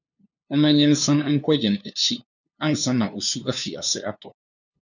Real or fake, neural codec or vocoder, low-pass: fake; codec, 16 kHz, 2 kbps, FunCodec, trained on LibriTTS, 25 frames a second; 7.2 kHz